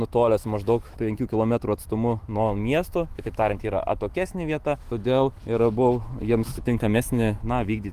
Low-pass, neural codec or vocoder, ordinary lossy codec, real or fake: 14.4 kHz; autoencoder, 48 kHz, 128 numbers a frame, DAC-VAE, trained on Japanese speech; Opus, 24 kbps; fake